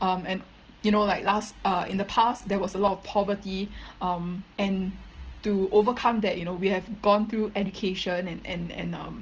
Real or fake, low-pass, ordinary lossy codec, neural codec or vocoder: real; 7.2 kHz; Opus, 16 kbps; none